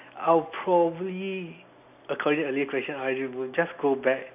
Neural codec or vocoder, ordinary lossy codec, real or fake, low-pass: none; none; real; 3.6 kHz